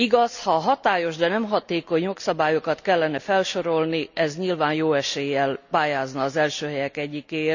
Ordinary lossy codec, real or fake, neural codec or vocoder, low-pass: none; real; none; 7.2 kHz